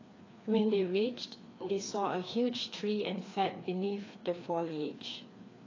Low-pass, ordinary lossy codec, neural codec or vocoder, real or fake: 7.2 kHz; AAC, 32 kbps; codec, 16 kHz, 2 kbps, FreqCodec, larger model; fake